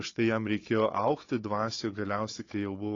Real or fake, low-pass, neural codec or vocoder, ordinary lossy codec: fake; 7.2 kHz; codec, 16 kHz, 4 kbps, FunCodec, trained on Chinese and English, 50 frames a second; AAC, 32 kbps